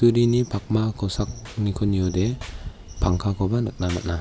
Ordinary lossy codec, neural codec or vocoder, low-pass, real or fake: none; none; none; real